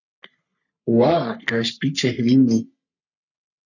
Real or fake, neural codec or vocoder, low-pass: fake; codec, 44.1 kHz, 3.4 kbps, Pupu-Codec; 7.2 kHz